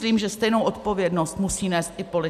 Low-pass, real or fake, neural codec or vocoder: 14.4 kHz; real; none